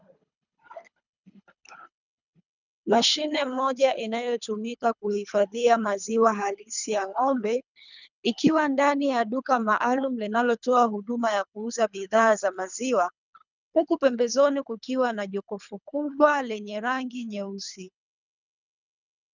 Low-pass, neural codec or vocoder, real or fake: 7.2 kHz; codec, 24 kHz, 3 kbps, HILCodec; fake